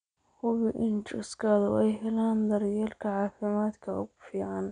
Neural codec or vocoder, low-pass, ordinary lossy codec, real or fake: none; 9.9 kHz; none; real